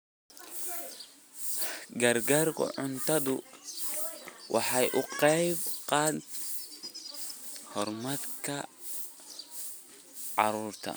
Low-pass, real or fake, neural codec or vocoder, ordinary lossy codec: none; real; none; none